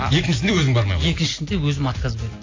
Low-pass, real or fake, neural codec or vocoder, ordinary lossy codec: 7.2 kHz; real; none; AAC, 32 kbps